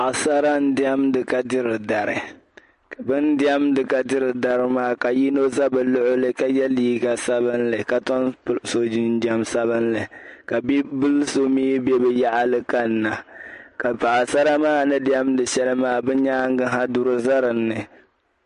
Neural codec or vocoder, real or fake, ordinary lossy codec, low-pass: none; real; MP3, 48 kbps; 10.8 kHz